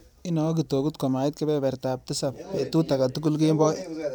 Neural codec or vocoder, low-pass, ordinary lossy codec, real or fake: vocoder, 44.1 kHz, 128 mel bands every 512 samples, BigVGAN v2; none; none; fake